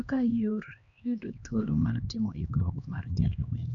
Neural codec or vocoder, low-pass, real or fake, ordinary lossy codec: codec, 16 kHz, 2 kbps, X-Codec, HuBERT features, trained on LibriSpeech; 7.2 kHz; fake; none